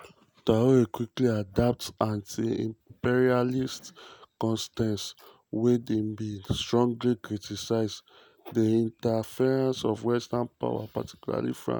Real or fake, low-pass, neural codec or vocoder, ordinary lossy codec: real; none; none; none